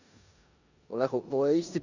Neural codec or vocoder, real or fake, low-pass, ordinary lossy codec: codec, 16 kHz in and 24 kHz out, 0.9 kbps, LongCat-Audio-Codec, four codebook decoder; fake; 7.2 kHz; none